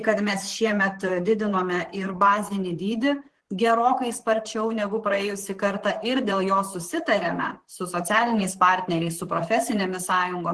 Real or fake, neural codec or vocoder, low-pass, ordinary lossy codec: fake; vocoder, 44.1 kHz, 128 mel bands, Pupu-Vocoder; 10.8 kHz; Opus, 16 kbps